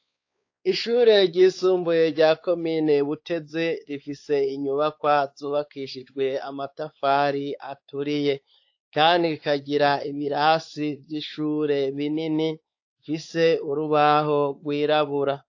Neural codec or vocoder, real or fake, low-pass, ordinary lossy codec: codec, 16 kHz, 4 kbps, X-Codec, WavLM features, trained on Multilingual LibriSpeech; fake; 7.2 kHz; AAC, 48 kbps